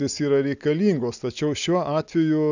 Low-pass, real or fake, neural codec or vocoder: 7.2 kHz; real; none